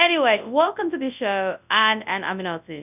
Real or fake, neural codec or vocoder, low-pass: fake; codec, 24 kHz, 0.9 kbps, WavTokenizer, large speech release; 3.6 kHz